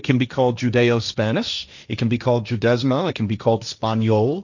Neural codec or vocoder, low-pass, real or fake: codec, 16 kHz, 1.1 kbps, Voila-Tokenizer; 7.2 kHz; fake